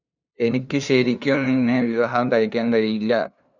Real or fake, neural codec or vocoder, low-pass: fake; codec, 16 kHz, 2 kbps, FunCodec, trained on LibriTTS, 25 frames a second; 7.2 kHz